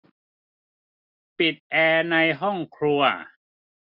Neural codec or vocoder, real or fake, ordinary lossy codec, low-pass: none; real; none; 5.4 kHz